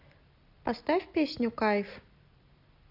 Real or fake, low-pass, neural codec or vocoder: real; 5.4 kHz; none